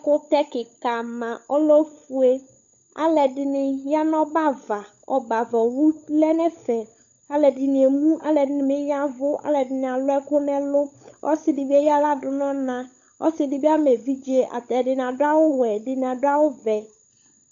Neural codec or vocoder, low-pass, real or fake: codec, 16 kHz, 16 kbps, FunCodec, trained on LibriTTS, 50 frames a second; 7.2 kHz; fake